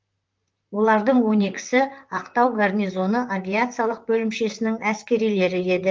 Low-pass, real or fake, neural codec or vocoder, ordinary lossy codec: 7.2 kHz; fake; vocoder, 44.1 kHz, 128 mel bands, Pupu-Vocoder; Opus, 32 kbps